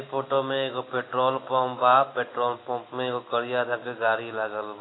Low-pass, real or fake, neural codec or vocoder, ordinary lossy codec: 7.2 kHz; real; none; AAC, 16 kbps